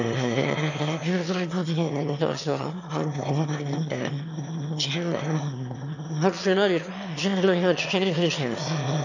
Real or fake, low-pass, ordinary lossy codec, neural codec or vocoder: fake; 7.2 kHz; none; autoencoder, 22.05 kHz, a latent of 192 numbers a frame, VITS, trained on one speaker